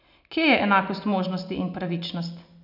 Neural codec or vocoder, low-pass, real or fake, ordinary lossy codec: none; 5.4 kHz; real; none